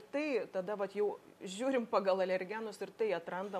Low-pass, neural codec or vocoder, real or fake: 14.4 kHz; none; real